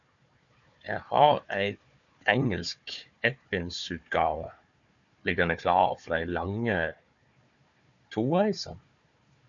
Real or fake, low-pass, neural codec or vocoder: fake; 7.2 kHz; codec, 16 kHz, 4 kbps, FunCodec, trained on Chinese and English, 50 frames a second